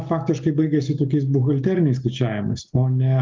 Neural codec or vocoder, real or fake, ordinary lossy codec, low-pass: none; real; Opus, 32 kbps; 7.2 kHz